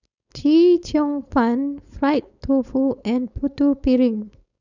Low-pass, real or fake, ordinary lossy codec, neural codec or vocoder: 7.2 kHz; fake; none; codec, 16 kHz, 4.8 kbps, FACodec